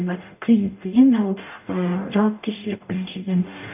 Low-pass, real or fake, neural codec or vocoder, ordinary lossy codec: 3.6 kHz; fake; codec, 44.1 kHz, 0.9 kbps, DAC; none